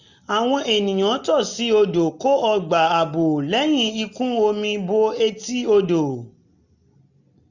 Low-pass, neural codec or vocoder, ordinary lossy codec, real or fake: 7.2 kHz; none; none; real